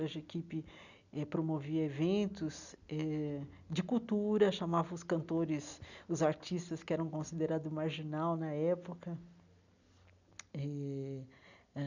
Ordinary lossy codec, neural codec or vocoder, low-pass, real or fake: none; none; 7.2 kHz; real